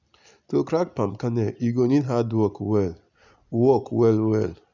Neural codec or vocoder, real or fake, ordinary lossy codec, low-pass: none; real; none; 7.2 kHz